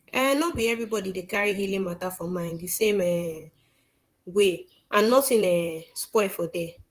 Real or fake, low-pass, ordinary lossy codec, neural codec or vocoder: fake; 14.4 kHz; Opus, 24 kbps; vocoder, 44.1 kHz, 128 mel bands, Pupu-Vocoder